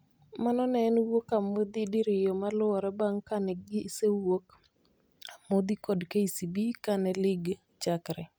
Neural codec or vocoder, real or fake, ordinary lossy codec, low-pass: none; real; none; none